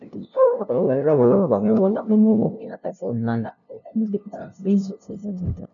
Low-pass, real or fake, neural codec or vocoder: 7.2 kHz; fake; codec, 16 kHz, 0.5 kbps, FunCodec, trained on LibriTTS, 25 frames a second